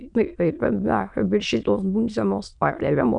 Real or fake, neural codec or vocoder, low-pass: fake; autoencoder, 22.05 kHz, a latent of 192 numbers a frame, VITS, trained on many speakers; 9.9 kHz